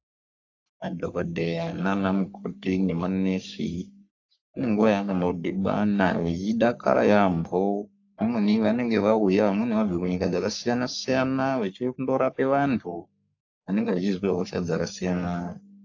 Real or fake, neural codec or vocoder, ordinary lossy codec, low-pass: fake; codec, 44.1 kHz, 3.4 kbps, Pupu-Codec; AAC, 48 kbps; 7.2 kHz